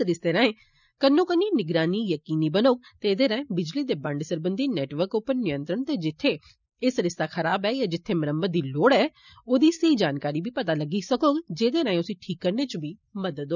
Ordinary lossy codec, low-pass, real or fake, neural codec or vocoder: none; 7.2 kHz; real; none